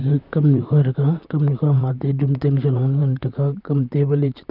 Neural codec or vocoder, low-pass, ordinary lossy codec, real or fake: vocoder, 44.1 kHz, 128 mel bands, Pupu-Vocoder; 5.4 kHz; none; fake